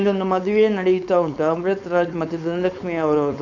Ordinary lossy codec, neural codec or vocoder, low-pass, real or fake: none; codec, 16 kHz, 4.8 kbps, FACodec; 7.2 kHz; fake